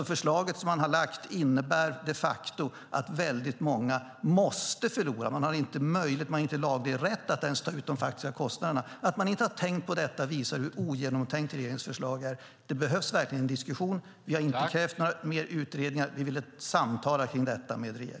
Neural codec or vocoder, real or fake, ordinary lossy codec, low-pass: none; real; none; none